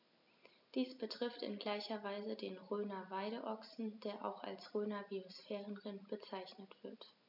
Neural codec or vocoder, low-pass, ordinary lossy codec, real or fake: none; 5.4 kHz; none; real